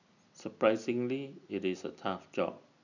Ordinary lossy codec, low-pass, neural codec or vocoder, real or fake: none; 7.2 kHz; none; real